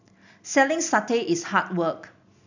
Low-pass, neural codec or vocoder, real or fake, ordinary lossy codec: 7.2 kHz; none; real; none